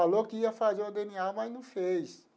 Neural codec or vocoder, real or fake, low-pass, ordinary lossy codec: none; real; none; none